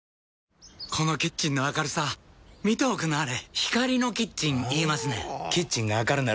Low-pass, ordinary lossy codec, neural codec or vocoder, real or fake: none; none; none; real